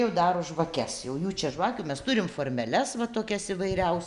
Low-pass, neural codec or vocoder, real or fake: 10.8 kHz; none; real